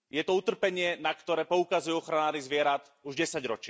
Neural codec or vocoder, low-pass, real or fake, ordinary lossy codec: none; none; real; none